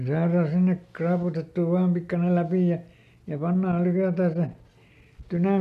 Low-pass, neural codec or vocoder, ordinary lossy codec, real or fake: 14.4 kHz; none; MP3, 64 kbps; real